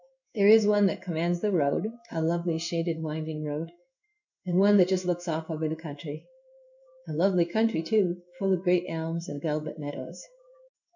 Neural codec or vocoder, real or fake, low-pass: codec, 16 kHz in and 24 kHz out, 1 kbps, XY-Tokenizer; fake; 7.2 kHz